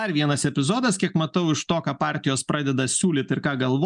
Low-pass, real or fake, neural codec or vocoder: 10.8 kHz; real; none